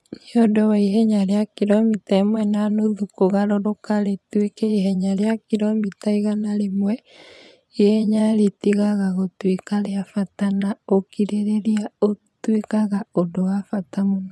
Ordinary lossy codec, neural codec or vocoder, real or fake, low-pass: none; vocoder, 24 kHz, 100 mel bands, Vocos; fake; none